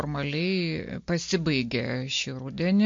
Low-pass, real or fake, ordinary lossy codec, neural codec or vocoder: 7.2 kHz; real; MP3, 48 kbps; none